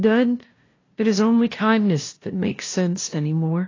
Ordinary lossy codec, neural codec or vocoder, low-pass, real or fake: AAC, 32 kbps; codec, 16 kHz, 0.5 kbps, FunCodec, trained on LibriTTS, 25 frames a second; 7.2 kHz; fake